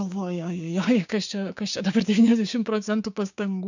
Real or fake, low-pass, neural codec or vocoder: fake; 7.2 kHz; codec, 16 kHz, 6 kbps, DAC